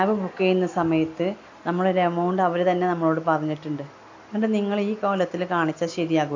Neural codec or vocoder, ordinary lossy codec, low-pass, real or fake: none; AAC, 48 kbps; 7.2 kHz; real